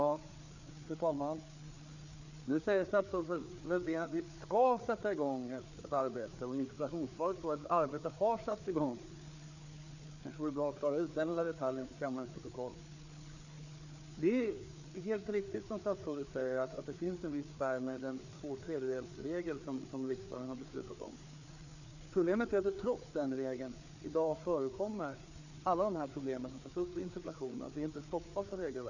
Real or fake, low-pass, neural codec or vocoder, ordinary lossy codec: fake; 7.2 kHz; codec, 16 kHz, 4 kbps, FreqCodec, larger model; none